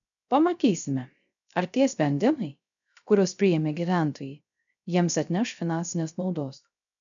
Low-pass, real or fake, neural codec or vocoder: 7.2 kHz; fake; codec, 16 kHz, 0.3 kbps, FocalCodec